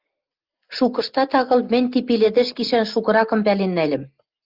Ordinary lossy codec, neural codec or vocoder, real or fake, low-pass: Opus, 32 kbps; none; real; 5.4 kHz